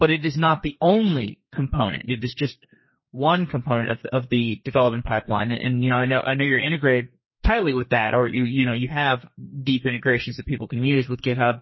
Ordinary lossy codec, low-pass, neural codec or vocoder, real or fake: MP3, 24 kbps; 7.2 kHz; codec, 32 kHz, 1.9 kbps, SNAC; fake